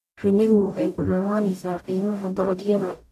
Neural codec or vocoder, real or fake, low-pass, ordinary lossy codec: codec, 44.1 kHz, 0.9 kbps, DAC; fake; 14.4 kHz; none